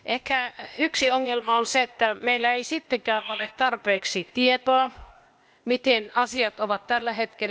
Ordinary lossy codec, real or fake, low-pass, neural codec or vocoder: none; fake; none; codec, 16 kHz, 0.8 kbps, ZipCodec